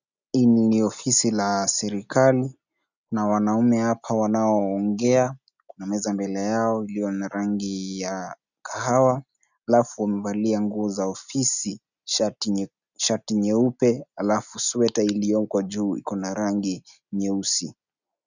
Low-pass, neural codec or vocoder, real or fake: 7.2 kHz; none; real